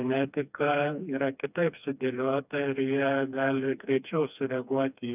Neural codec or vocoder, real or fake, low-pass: codec, 16 kHz, 2 kbps, FreqCodec, smaller model; fake; 3.6 kHz